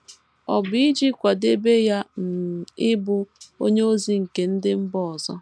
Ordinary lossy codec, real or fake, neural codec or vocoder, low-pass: none; real; none; none